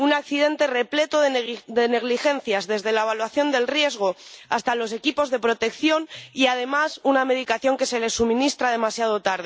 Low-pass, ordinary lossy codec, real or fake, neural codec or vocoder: none; none; real; none